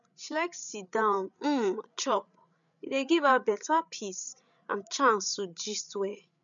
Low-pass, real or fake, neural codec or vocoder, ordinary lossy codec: 7.2 kHz; fake; codec, 16 kHz, 8 kbps, FreqCodec, larger model; none